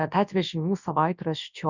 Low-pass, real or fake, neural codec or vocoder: 7.2 kHz; fake; codec, 24 kHz, 0.9 kbps, WavTokenizer, large speech release